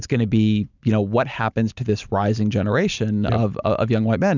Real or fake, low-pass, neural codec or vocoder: real; 7.2 kHz; none